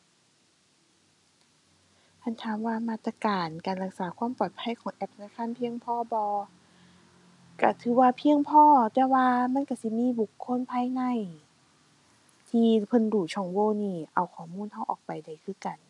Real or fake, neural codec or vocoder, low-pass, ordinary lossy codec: real; none; 10.8 kHz; none